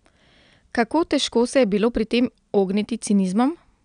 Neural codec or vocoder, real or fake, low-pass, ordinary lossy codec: none; real; 9.9 kHz; none